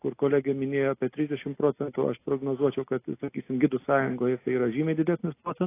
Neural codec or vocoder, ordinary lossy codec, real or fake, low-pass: none; AAC, 24 kbps; real; 3.6 kHz